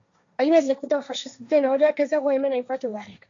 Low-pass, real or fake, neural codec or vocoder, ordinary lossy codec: 7.2 kHz; fake; codec, 16 kHz, 1.1 kbps, Voila-Tokenizer; MP3, 64 kbps